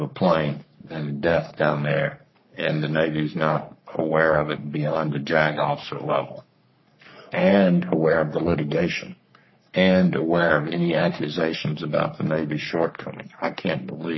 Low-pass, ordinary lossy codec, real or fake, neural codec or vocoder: 7.2 kHz; MP3, 24 kbps; fake; codec, 44.1 kHz, 3.4 kbps, Pupu-Codec